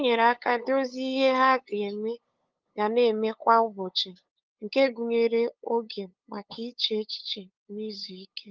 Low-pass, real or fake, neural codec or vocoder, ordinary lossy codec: 7.2 kHz; fake; codec, 16 kHz, 8 kbps, FunCodec, trained on LibriTTS, 25 frames a second; Opus, 32 kbps